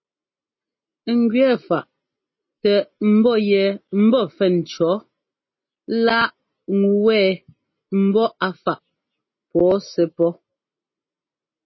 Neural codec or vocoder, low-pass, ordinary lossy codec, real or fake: none; 7.2 kHz; MP3, 24 kbps; real